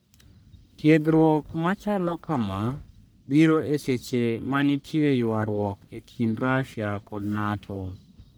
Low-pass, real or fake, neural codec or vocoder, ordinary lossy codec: none; fake; codec, 44.1 kHz, 1.7 kbps, Pupu-Codec; none